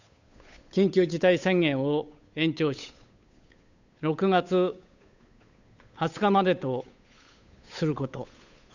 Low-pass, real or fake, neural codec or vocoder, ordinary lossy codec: 7.2 kHz; fake; codec, 16 kHz, 8 kbps, FunCodec, trained on Chinese and English, 25 frames a second; none